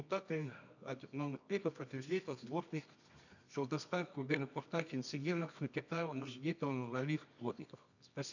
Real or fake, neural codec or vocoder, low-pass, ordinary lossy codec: fake; codec, 24 kHz, 0.9 kbps, WavTokenizer, medium music audio release; 7.2 kHz; none